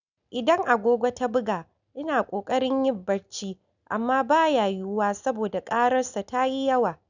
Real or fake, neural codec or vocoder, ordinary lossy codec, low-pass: real; none; none; 7.2 kHz